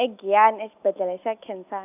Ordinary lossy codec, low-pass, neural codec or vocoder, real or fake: none; 3.6 kHz; none; real